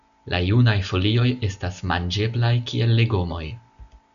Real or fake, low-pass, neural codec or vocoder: real; 7.2 kHz; none